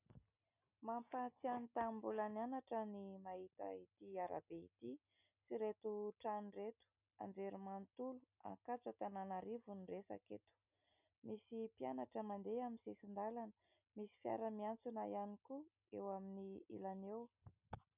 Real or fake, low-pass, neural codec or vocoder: real; 3.6 kHz; none